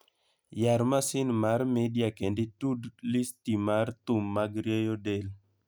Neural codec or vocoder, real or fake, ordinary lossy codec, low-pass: none; real; none; none